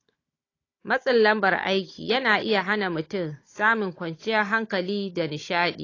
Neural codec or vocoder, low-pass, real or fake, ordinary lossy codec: codec, 16 kHz, 16 kbps, FunCodec, trained on Chinese and English, 50 frames a second; 7.2 kHz; fake; AAC, 32 kbps